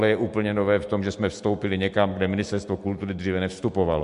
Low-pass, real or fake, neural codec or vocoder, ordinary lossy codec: 10.8 kHz; real; none; MP3, 64 kbps